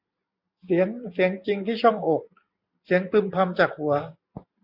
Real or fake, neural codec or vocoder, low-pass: real; none; 5.4 kHz